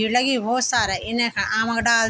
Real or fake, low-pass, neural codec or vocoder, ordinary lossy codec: real; none; none; none